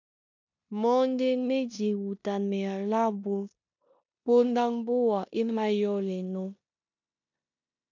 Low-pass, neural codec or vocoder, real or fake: 7.2 kHz; codec, 16 kHz in and 24 kHz out, 0.9 kbps, LongCat-Audio-Codec, four codebook decoder; fake